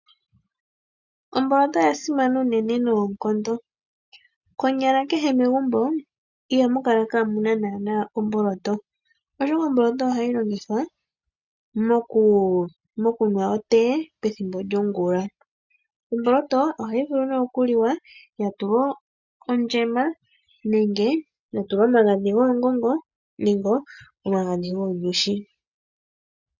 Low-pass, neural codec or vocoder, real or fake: 7.2 kHz; none; real